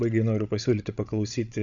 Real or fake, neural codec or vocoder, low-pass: fake; codec, 16 kHz, 16 kbps, FreqCodec, larger model; 7.2 kHz